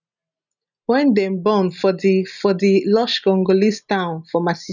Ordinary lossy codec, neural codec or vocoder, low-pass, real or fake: none; none; 7.2 kHz; real